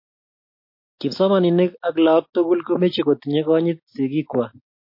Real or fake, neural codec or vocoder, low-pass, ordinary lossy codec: real; none; 5.4 kHz; MP3, 32 kbps